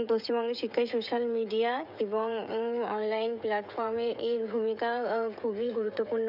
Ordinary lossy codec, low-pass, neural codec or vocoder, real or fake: none; 5.4 kHz; codec, 16 kHz, 16 kbps, FreqCodec, smaller model; fake